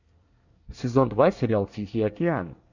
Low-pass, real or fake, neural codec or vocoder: 7.2 kHz; fake; codec, 24 kHz, 1 kbps, SNAC